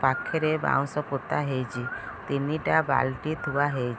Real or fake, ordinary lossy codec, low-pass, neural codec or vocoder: real; none; none; none